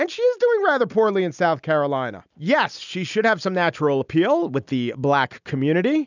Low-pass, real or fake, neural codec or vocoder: 7.2 kHz; real; none